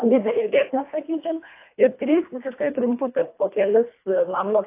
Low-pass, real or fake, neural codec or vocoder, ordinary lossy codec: 3.6 kHz; fake; codec, 24 kHz, 1.5 kbps, HILCodec; AAC, 24 kbps